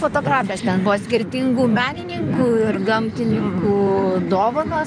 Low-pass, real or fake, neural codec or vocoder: 9.9 kHz; fake; codec, 16 kHz in and 24 kHz out, 2.2 kbps, FireRedTTS-2 codec